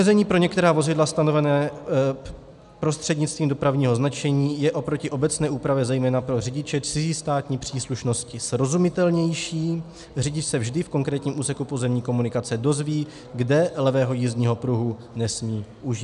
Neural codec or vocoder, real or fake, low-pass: none; real; 10.8 kHz